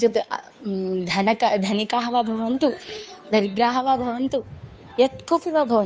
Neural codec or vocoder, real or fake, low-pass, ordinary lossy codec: codec, 16 kHz, 2 kbps, FunCodec, trained on Chinese and English, 25 frames a second; fake; none; none